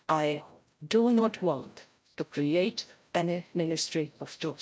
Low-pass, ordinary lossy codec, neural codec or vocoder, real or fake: none; none; codec, 16 kHz, 0.5 kbps, FreqCodec, larger model; fake